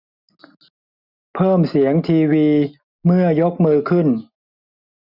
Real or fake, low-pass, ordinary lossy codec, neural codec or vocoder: real; 5.4 kHz; none; none